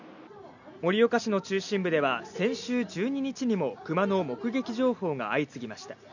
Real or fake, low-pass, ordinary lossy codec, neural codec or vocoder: real; 7.2 kHz; none; none